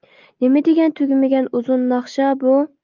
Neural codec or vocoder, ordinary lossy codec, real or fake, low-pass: none; Opus, 24 kbps; real; 7.2 kHz